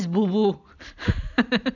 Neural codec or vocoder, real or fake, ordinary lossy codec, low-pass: none; real; none; 7.2 kHz